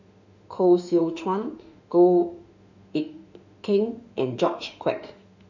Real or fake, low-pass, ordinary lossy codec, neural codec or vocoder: fake; 7.2 kHz; none; autoencoder, 48 kHz, 32 numbers a frame, DAC-VAE, trained on Japanese speech